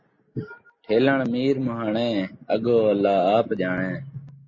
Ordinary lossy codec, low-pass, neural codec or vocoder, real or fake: MP3, 32 kbps; 7.2 kHz; none; real